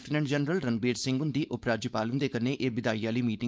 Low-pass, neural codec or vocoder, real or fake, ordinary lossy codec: none; codec, 16 kHz, 4.8 kbps, FACodec; fake; none